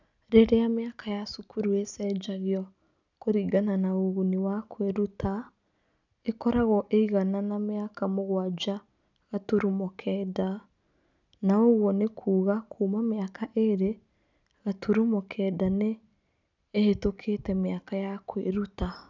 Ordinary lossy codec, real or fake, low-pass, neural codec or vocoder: none; real; 7.2 kHz; none